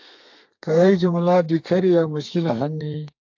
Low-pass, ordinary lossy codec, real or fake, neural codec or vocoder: 7.2 kHz; AAC, 48 kbps; fake; codec, 32 kHz, 1.9 kbps, SNAC